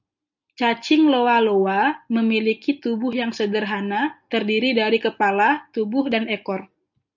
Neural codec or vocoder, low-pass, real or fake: none; 7.2 kHz; real